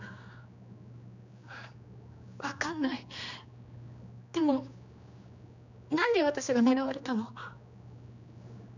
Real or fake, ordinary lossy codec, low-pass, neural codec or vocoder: fake; none; 7.2 kHz; codec, 16 kHz, 2 kbps, X-Codec, HuBERT features, trained on general audio